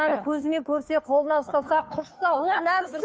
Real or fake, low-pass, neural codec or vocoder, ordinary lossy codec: fake; none; codec, 16 kHz, 2 kbps, FunCodec, trained on Chinese and English, 25 frames a second; none